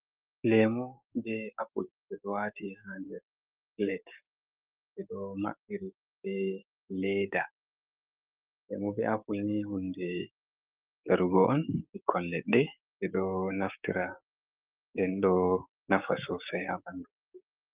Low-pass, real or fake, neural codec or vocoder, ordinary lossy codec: 3.6 kHz; fake; codec, 16 kHz, 6 kbps, DAC; Opus, 24 kbps